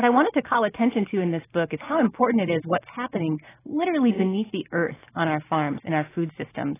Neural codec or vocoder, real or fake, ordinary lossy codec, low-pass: none; real; AAC, 16 kbps; 3.6 kHz